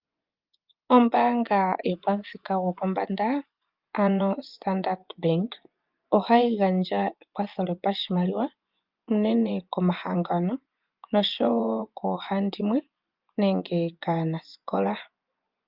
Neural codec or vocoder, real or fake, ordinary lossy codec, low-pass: vocoder, 24 kHz, 100 mel bands, Vocos; fake; Opus, 24 kbps; 5.4 kHz